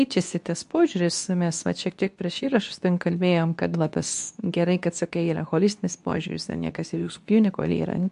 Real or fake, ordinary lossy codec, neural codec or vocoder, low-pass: fake; MP3, 64 kbps; codec, 24 kHz, 0.9 kbps, WavTokenizer, medium speech release version 2; 10.8 kHz